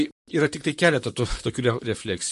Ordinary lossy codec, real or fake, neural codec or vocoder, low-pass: MP3, 48 kbps; fake; vocoder, 44.1 kHz, 128 mel bands, Pupu-Vocoder; 14.4 kHz